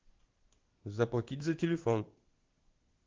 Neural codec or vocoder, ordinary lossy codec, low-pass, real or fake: codec, 16 kHz in and 24 kHz out, 1 kbps, XY-Tokenizer; Opus, 16 kbps; 7.2 kHz; fake